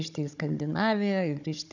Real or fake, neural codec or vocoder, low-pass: fake; codec, 16 kHz, 16 kbps, FunCodec, trained on Chinese and English, 50 frames a second; 7.2 kHz